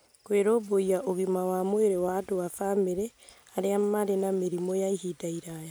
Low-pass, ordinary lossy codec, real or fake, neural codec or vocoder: none; none; real; none